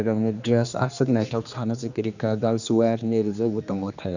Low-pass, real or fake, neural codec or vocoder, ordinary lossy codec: 7.2 kHz; fake; codec, 16 kHz, 2 kbps, X-Codec, HuBERT features, trained on general audio; none